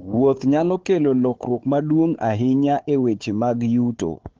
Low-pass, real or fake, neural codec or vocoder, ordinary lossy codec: 7.2 kHz; fake; codec, 16 kHz, 4 kbps, FunCodec, trained on Chinese and English, 50 frames a second; Opus, 16 kbps